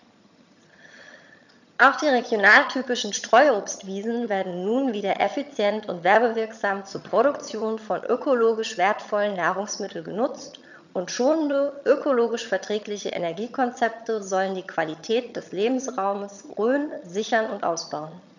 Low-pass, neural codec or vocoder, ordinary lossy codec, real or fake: 7.2 kHz; vocoder, 22.05 kHz, 80 mel bands, HiFi-GAN; none; fake